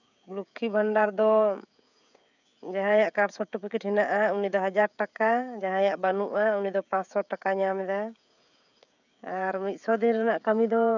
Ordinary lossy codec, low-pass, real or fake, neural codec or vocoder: none; 7.2 kHz; fake; codec, 16 kHz, 16 kbps, FreqCodec, smaller model